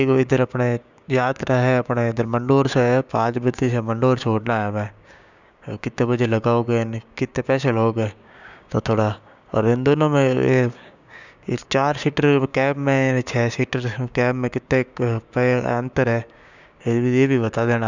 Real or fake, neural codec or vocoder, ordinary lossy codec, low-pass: fake; codec, 16 kHz, 6 kbps, DAC; none; 7.2 kHz